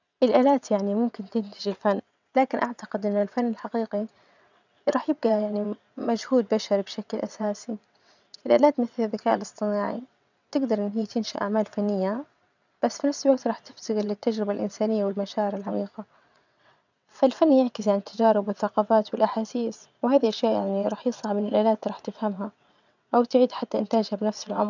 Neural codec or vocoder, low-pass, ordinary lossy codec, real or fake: vocoder, 22.05 kHz, 80 mel bands, WaveNeXt; 7.2 kHz; none; fake